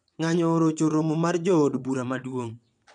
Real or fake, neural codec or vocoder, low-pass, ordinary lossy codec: fake; vocoder, 22.05 kHz, 80 mel bands, WaveNeXt; 9.9 kHz; none